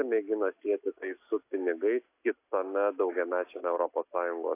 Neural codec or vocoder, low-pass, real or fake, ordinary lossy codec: none; 3.6 kHz; real; AAC, 24 kbps